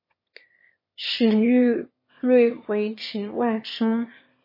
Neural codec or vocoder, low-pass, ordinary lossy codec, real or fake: autoencoder, 22.05 kHz, a latent of 192 numbers a frame, VITS, trained on one speaker; 5.4 kHz; MP3, 24 kbps; fake